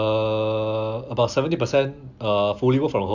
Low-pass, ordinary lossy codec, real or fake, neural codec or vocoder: 7.2 kHz; none; real; none